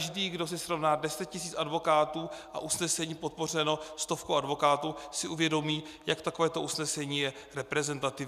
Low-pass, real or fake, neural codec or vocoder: 14.4 kHz; real; none